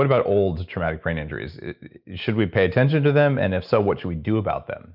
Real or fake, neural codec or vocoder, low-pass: real; none; 5.4 kHz